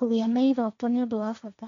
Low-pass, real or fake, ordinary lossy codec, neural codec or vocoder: 7.2 kHz; fake; MP3, 96 kbps; codec, 16 kHz, 1.1 kbps, Voila-Tokenizer